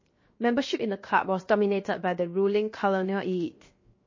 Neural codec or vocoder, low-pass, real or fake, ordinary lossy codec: codec, 16 kHz, 0.7 kbps, FocalCodec; 7.2 kHz; fake; MP3, 32 kbps